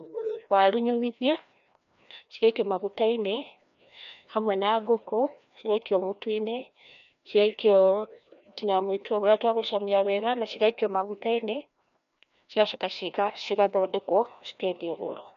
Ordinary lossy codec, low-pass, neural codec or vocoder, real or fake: none; 7.2 kHz; codec, 16 kHz, 1 kbps, FreqCodec, larger model; fake